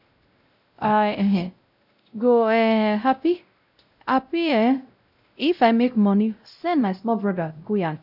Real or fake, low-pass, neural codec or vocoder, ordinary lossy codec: fake; 5.4 kHz; codec, 16 kHz, 0.5 kbps, X-Codec, WavLM features, trained on Multilingual LibriSpeech; none